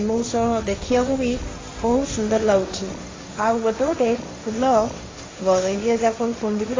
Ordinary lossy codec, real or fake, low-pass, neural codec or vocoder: AAC, 32 kbps; fake; 7.2 kHz; codec, 16 kHz, 1.1 kbps, Voila-Tokenizer